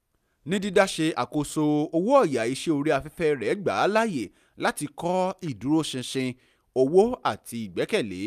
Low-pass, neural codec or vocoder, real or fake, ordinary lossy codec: 14.4 kHz; none; real; none